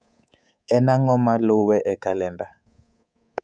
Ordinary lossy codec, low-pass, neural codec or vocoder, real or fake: none; 9.9 kHz; codec, 24 kHz, 3.1 kbps, DualCodec; fake